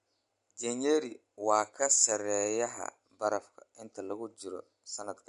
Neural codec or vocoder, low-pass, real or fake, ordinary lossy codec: none; 10.8 kHz; real; MP3, 64 kbps